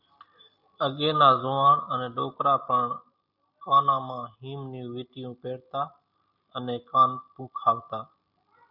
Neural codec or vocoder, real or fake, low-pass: none; real; 5.4 kHz